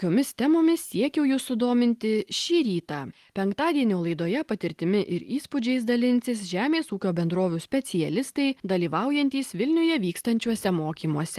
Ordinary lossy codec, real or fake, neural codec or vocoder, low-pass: Opus, 24 kbps; real; none; 14.4 kHz